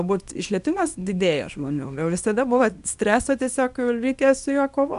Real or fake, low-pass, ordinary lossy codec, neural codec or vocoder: fake; 10.8 kHz; AAC, 96 kbps; codec, 24 kHz, 0.9 kbps, WavTokenizer, small release